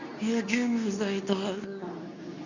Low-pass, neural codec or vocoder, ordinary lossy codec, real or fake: 7.2 kHz; codec, 24 kHz, 0.9 kbps, WavTokenizer, medium speech release version 2; none; fake